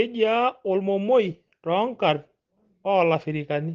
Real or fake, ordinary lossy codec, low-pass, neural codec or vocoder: real; Opus, 16 kbps; 7.2 kHz; none